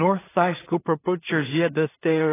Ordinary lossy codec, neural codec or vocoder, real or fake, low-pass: AAC, 16 kbps; codec, 16 kHz in and 24 kHz out, 0.4 kbps, LongCat-Audio-Codec, two codebook decoder; fake; 3.6 kHz